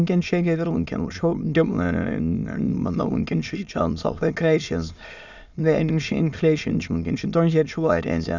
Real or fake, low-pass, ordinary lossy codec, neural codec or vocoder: fake; 7.2 kHz; none; autoencoder, 22.05 kHz, a latent of 192 numbers a frame, VITS, trained on many speakers